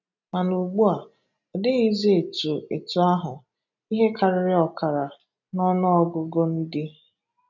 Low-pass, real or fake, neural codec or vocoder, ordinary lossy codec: 7.2 kHz; real; none; none